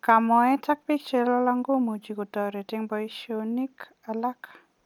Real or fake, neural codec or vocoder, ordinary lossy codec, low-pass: real; none; none; 19.8 kHz